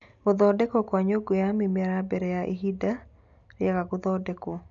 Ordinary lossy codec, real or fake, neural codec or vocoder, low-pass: none; real; none; 7.2 kHz